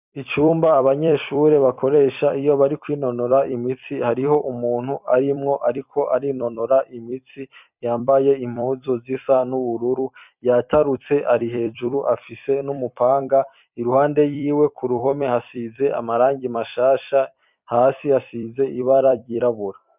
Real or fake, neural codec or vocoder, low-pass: fake; vocoder, 44.1 kHz, 128 mel bands every 256 samples, BigVGAN v2; 3.6 kHz